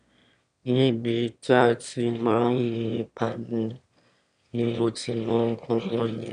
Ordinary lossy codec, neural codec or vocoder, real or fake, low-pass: none; autoencoder, 22.05 kHz, a latent of 192 numbers a frame, VITS, trained on one speaker; fake; 9.9 kHz